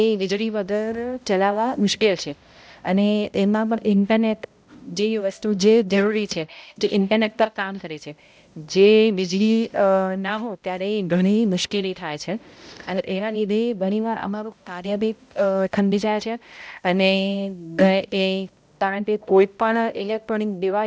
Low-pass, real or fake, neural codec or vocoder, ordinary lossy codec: none; fake; codec, 16 kHz, 0.5 kbps, X-Codec, HuBERT features, trained on balanced general audio; none